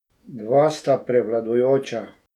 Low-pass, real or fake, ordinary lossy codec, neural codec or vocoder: 19.8 kHz; fake; none; autoencoder, 48 kHz, 128 numbers a frame, DAC-VAE, trained on Japanese speech